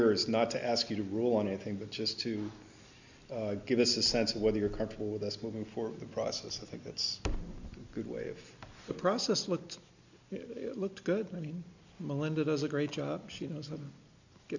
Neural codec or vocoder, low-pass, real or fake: none; 7.2 kHz; real